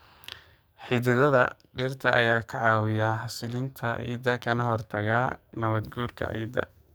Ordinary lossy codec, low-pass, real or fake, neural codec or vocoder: none; none; fake; codec, 44.1 kHz, 2.6 kbps, SNAC